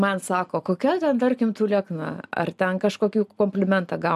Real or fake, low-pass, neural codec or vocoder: real; 14.4 kHz; none